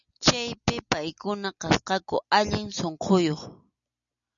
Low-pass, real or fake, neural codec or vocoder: 7.2 kHz; real; none